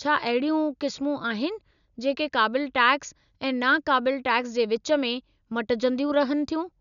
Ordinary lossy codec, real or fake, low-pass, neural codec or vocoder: none; real; 7.2 kHz; none